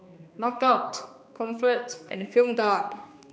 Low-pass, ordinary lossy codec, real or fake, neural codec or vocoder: none; none; fake; codec, 16 kHz, 2 kbps, X-Codec, HuBERT features, trained on balanced general audio